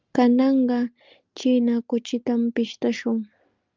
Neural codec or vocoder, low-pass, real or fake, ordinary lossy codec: none; 7.2 kHz; real; Opus, 32 kbps